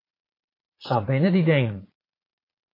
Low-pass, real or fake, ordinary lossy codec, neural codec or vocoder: 5.4 kHz; fake; AAC, 32 kbps; vocoder, 22.05 kHz, 80 mel bands, Vocos